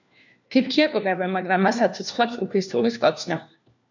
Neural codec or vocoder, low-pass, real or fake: codec, 16 kHz, 1 kbps, FunCodec, trained on LibriTTS, 50 frames a second; 7.2 kHz; fake